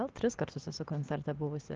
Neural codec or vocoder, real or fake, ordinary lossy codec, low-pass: none; real; Opus, 16 kbps; 7.2 kHz